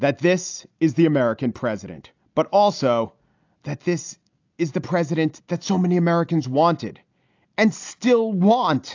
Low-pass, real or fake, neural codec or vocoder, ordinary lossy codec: 7.2 kHz; real; none; AAC, 48 kbps